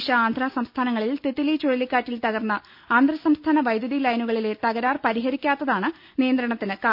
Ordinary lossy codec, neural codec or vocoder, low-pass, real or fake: MP3, 48 kbps; none; 5.4 kHz; real